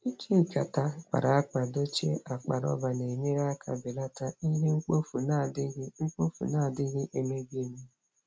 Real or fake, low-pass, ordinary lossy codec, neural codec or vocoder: real; none; none; none